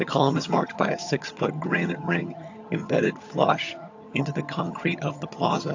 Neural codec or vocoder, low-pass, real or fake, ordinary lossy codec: vocoder, 22.05 kHz, 80 mel bands, HiFi-GAN; 7.2 kHz; fake; AAC, 48 kbps